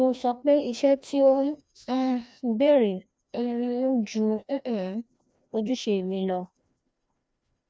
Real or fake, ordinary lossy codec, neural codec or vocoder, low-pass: fake; none; codec, 16 kHz, 1 kbps, FreqCodec, larger model; none